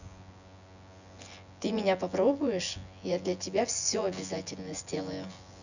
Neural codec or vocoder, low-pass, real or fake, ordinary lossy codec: vocoder, 24 kHz, 100 mel bands, Vocos; 7.2 kHz; fake; none